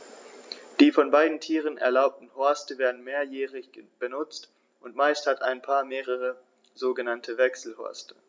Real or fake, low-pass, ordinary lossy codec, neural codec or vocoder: real; none; none; none